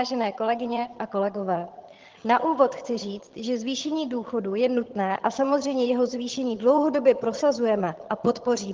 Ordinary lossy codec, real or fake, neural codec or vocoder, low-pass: Opus, 16 kbps; fake; vocoder, 22.05 kHz, 80 mel bands, HiFi-GAN; 7.2 kHz